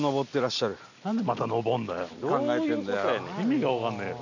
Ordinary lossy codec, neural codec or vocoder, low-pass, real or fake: none; none; 7.2 kHz; real